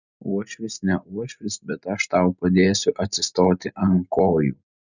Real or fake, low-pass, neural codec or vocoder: real; 7.2 kHz; none